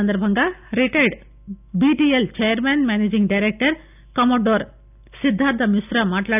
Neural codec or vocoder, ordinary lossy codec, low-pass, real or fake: none; none; 3.6 kHz; real